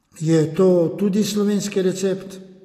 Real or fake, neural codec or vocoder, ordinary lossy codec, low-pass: real; none; AAC, 48 kbps; 14.4 kHz